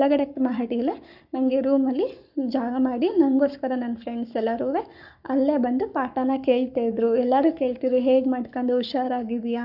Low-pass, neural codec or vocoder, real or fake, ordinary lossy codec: 5.4 kHz; codec, 44.1 kHz, 7.8 kbps, Pupu-Codec; fake; none